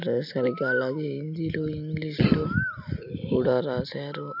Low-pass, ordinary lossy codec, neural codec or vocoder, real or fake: 5.4 kHz; none; none; real